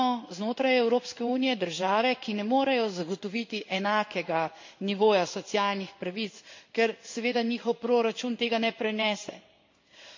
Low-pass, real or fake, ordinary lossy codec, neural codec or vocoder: 7.2 kHz; fake; MP3, 48 kbps; codec, 16 kHz in and 24 kHz out, 1 kbps, XY-Tokenizer